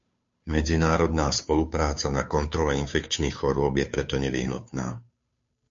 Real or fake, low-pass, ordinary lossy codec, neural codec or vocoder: fake; 7.2 kHz; MP3, 48 kbps; codec, 16 kHz, 2 kbps, FunCodec, trained on Chinese and English, 25 frames a second